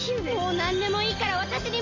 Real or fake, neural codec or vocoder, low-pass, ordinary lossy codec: real; none; 7.2 kHz; MP3, 32 kbps